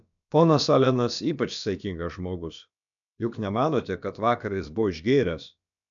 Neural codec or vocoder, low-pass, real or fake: codec, 16 kHz, about 1 kbps, DyCAST, with the encoder's durations; 7.2 kHz; fake